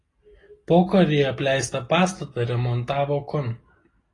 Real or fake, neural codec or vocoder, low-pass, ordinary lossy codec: real; none; 10.8 kHz; AAC, 32 kbps